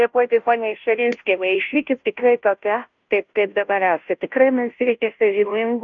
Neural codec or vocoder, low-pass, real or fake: codec, 16 kHz, 0.5 kbps, FunCodec, trained on Chinese and English, 25 frames a second; 7.2 kHz; fake